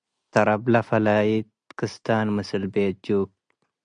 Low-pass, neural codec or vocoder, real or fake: 9.9 kHz; none; real